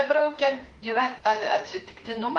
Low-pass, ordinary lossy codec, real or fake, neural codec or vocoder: 10.8 kHz; Opus, 24 kbps; fake; autoencoder, 48 kHz, 32 numbers a frame, DAC-VAE, trained on Japanese speech